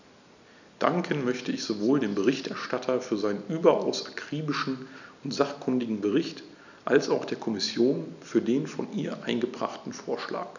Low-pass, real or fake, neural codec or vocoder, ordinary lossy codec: 7.2 kHz; real; none; none